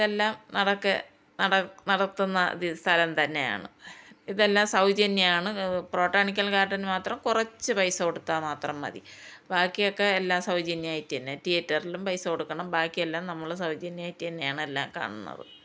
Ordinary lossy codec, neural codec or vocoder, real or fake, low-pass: none; none; real; none